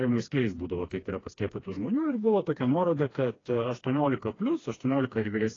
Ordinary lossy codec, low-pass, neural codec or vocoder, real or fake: AAC, 32 kbps; 7.2 kHz; codec, 16 kHz, 2 kbps, FreqCodec, smaller model; fake